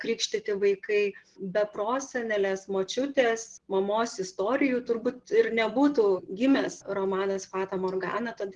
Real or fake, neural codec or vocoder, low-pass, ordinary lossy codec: real; none; 10.8 kHz; Opus, 16 kbps